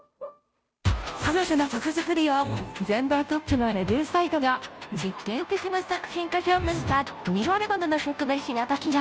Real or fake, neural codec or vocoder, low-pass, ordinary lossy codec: fake; codec, 16 kHz, 0.5 kbps, FunCodec, trained on Chinese and English, 25 frames a second; none; none